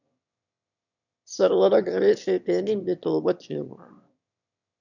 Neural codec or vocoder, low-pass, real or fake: autoencoder, 22.05 kHz, a latent of 192 numbers a frame, VITS, trained on one speaker; 7.2 kHz; fake